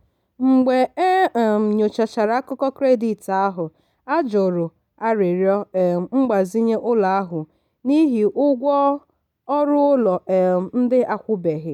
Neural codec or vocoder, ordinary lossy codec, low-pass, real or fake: none; none; 19.8 kHz; real